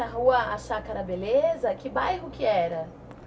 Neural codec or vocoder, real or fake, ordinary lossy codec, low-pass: none; real; none; none